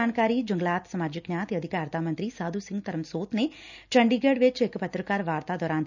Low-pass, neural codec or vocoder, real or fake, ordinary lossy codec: 7.2 kHz; none; real; none